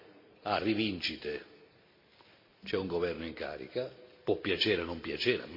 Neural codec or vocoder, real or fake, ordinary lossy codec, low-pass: none; real; MP3, 32 kbps; 5.4 kHz